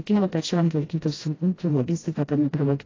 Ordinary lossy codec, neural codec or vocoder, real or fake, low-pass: AAC, 32 kbps; codec, 16 kHz, 0.5 kbps, FreqCodec, smaller model; fake; 7.2 kHz